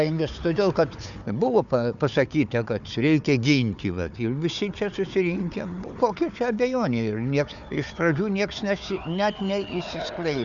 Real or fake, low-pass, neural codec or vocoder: fake; 7.2 kHz; codec, 16 kHz, 4 kbps, FunCodec, trained on Chinese and English, 50 frames a second